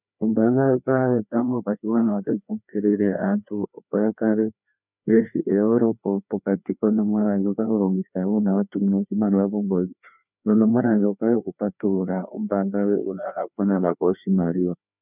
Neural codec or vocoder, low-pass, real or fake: codec, 16 kHz, 2 kbps, FreqCodec, larger model; 3.6 kHz; fake